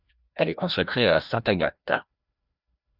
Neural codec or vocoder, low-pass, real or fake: codec, 16 kHz, 1 kbps, FreqCodec, larger model; 5.4 kHz; fake